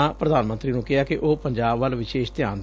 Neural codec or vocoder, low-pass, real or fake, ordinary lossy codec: none; none; real; none